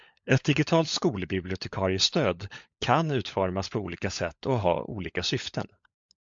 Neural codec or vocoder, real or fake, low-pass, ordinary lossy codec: codec, 16 kHz, 16 kbps, FunCodec, trained on LibriTTS, 50 frames a second; fake; 7.2 kHz; MP3, 64 kbps